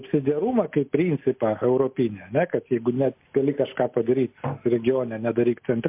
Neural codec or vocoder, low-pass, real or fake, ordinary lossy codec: none; 3.6 kHz; real; MP3, 32 kbps